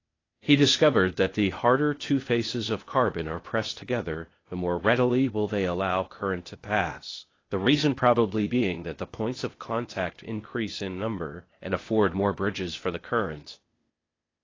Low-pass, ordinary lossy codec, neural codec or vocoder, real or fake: 7.2 kHz; AAC, 32 kbps; codec, 16 kHz, 0.8 kbps, ZipCodec; fake